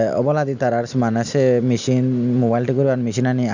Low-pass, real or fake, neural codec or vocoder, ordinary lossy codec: 7.2 kHz; real; none; none